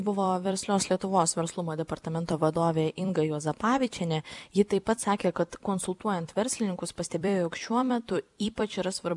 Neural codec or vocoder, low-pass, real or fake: vocoder, 44.1 kHz, 128 mel bands every 256 samples, BigVGAN v2; 10.8 kHz; fake